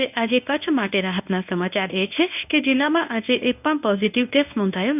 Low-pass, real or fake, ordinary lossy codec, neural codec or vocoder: 3.6 kHz; fake; none; codec, 24 kHz, 0.9 kbps, WavTokenizer, medium speech release version 1